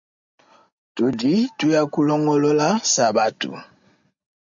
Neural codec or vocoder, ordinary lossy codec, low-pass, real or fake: none; MP3, 64 kbps; 7.2 kHz; real